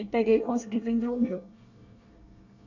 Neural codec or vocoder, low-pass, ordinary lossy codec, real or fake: codec, 24 kHz, 1 kbps, SNAC; 7.2 kHz; none; fake